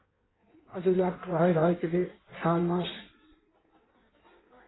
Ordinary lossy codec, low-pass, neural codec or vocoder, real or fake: AAC, 16 kbps; 7.2 kHz; codec, 16 kHz in and 24 kHz out, 1.1 kbps, FireRedTTS-2 codec; fake